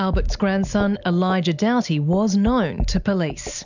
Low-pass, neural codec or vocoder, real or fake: 7.2 kHz; none; real